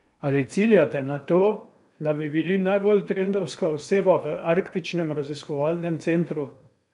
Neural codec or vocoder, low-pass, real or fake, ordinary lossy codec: codec, 16 kHz in and 24 kHz out, 0.8 kbps, FocalCodec, streaming, 65536 codes; 10.8 kHz; fake; none